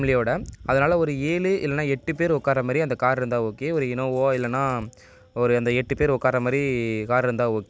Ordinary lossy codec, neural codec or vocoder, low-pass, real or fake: none; none; none; real